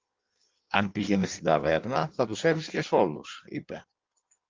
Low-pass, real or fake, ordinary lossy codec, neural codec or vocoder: 7.2 kHz; fake; Opus, 24 kbps; codec, 16 kHz in and 24 kHz out, 1.1 kbps, FireRedTTS-2 codec